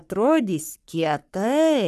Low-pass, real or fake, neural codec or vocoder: 14.4 kHz; fake; codec, 44.1 kHz, 3.4 kbps, Pupu-Codec